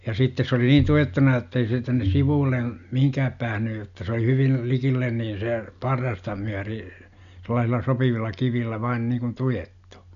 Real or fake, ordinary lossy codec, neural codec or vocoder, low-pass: real; none; none; 7.2 kHz